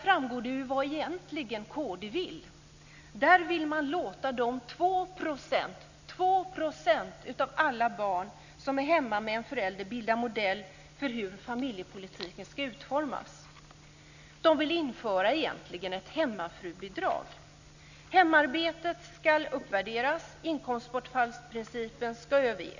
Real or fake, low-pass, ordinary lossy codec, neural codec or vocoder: real; 7.2 kHz; none; none